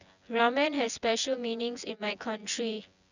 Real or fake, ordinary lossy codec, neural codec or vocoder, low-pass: fake; none; vocoder, 24 kHz, 100 mel bands, Vocos; 7.2 kHz